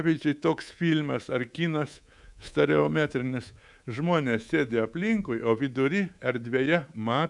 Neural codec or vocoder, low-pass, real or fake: codec, 24 kHz, 3.1 kbps, DualCodec; 10.8 kHz; fake